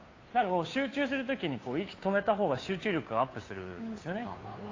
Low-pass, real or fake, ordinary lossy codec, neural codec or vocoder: 7.2 kHz; fake; AAC, 32 kbps; codec, 16 kHz, 2 kbps, FunCodec, trained on Chinese and English, 25 frames a second